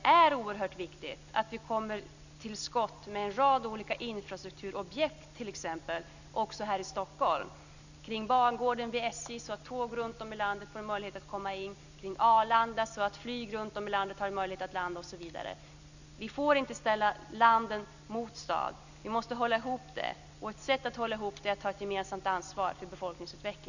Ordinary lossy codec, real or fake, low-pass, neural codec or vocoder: none; real; 7.2 kHz; none